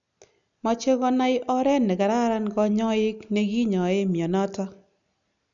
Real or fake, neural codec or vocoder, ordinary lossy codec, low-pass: real; none; none; 7.2 kHz